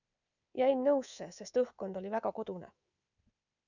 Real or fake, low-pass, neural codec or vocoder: fake; 7.2 kHz; codec, 24 kHz, 3.1 kbps, DualCodec